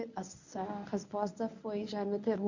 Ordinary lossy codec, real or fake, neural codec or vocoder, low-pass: none; fake; codec, 24 kHz, 0.9 kbps, WavTokenizer, medium speech release version 1; 7.2 kHz